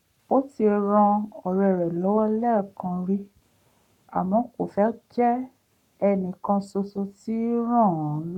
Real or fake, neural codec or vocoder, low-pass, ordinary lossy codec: fake; codec, 44.1 kHz, 7.8 kbps, Pupu-Codec; 19.8 kHz; none